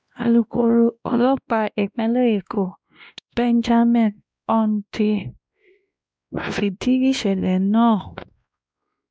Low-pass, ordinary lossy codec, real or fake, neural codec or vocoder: none; none; fake; codec, 16 kHz, 1 kbps, X-Codec, WavLM features, trained on Multilingual LibriSpeech